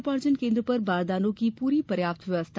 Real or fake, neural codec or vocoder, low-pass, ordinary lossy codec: real; none; none; none